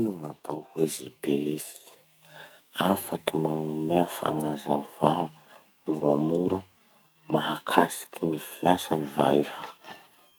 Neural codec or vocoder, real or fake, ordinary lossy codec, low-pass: codec, 44.1 kHz, 2.6 kbps, SNAC; fake; none; none